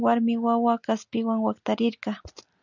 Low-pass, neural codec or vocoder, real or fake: 7.2 kHz; none; real